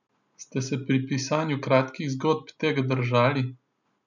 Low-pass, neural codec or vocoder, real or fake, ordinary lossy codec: 7.2 kHz; none; real; none